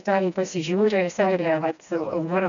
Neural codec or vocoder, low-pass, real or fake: codec, 16 kHz, 1 kbps, FreqCodec, smaller model; 7.2 kHz; fake